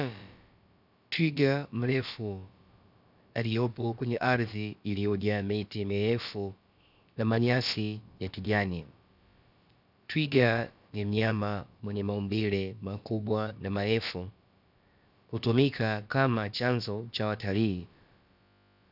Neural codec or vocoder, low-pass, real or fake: codec, 16 kHz, about 1 kbps, DyCAST, with the encoder's durations; 5.4 kHz; fake